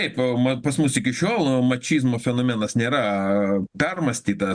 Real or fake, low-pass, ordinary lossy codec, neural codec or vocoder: real; 9.9 kHz; Opus, 64 kbps; none